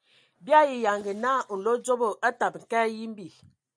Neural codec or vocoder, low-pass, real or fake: none; 9.9 kHz; real